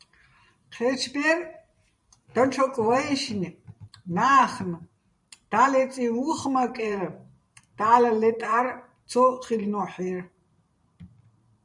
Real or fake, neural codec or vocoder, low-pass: fake; vocoder, 44.1 kHz, 128 mel bands every 512 samples, BigVGAN v2; 10.8 kHz